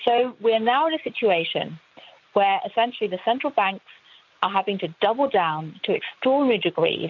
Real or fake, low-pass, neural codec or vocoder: real; 7.2 kHz; none